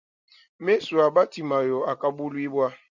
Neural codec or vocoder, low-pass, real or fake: none; 7.2 kHz; real